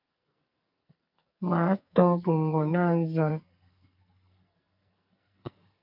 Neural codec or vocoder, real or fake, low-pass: codec, 44.1 kHz, 2.6 kbps, SNAC; fake; 5.4 kHz